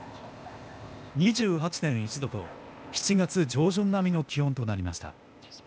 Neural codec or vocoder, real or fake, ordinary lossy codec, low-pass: codec, 16 kHz, 0.8 kbps, ZipCodec; fake; none; none